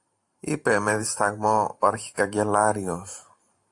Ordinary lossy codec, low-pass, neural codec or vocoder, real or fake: AAC, 64 kbps; 10.8 kHz; vocoder, 44.1 kHz, 128 mel bands every 512 samples, BigVGAN v2; fake